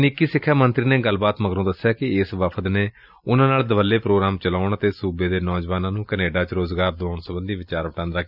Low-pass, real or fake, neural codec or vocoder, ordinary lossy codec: 5.4 kHz; real; none; none